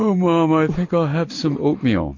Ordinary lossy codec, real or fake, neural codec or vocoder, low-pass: MP3, 48 kbps; real; none; 7.2 kHz